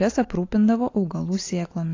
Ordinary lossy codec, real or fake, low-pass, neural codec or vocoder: AAC, 32 kbps; real; 7.2 kHz; none